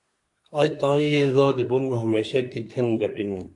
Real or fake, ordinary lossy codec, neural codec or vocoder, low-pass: fake; AAC, 64 kbps; codec, 24 kHz, 1 kbps, SNAC; 10.8 kHz